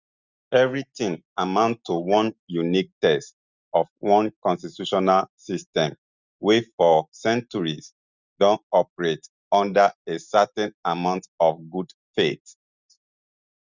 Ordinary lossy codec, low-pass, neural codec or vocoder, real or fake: Opus, 64 kbps; 7.2 kHz; none; real